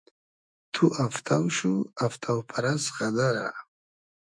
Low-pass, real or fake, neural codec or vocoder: 9.9 kHz; fake; autoencoder, 48 kHz, 128 numbers a frame, DAC-VAE, trained on Japanese speech